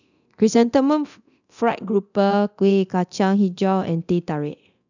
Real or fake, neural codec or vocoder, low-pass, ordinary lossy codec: fake; codec, 24 kHz, 0.9 kbps, DualCodec; 7.2 kHz; none